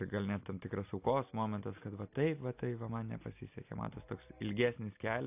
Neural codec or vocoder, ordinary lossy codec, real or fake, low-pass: none; AAC, 32 kbps; real; 3.6 kHz